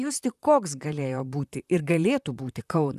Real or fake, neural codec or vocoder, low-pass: fake; vocoder, 44.1 kHz, 128 mel bands, Pupu-Vocoder; 14.4 kHz